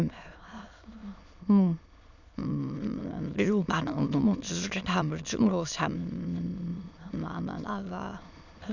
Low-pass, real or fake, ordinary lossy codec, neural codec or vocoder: 7.2 kHz; fake; none; autoencoder, 22.05 kHz, a latent of 192 numbers a frame, VITS, trained on many speakers